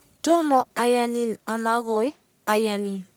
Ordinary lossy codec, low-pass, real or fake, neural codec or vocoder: none; none; fake; codec, 44.1 kHz, 1.7 kbps, Pupu-Codec